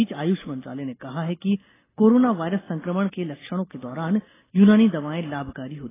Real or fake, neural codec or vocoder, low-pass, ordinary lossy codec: real; none; 3.6 kHz; AAC, 16 kbps